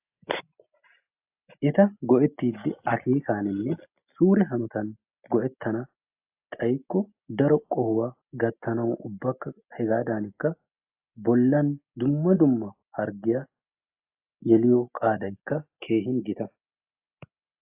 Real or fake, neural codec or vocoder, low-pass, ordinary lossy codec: real; none; 3.6 kHz; AAC, 32 kbps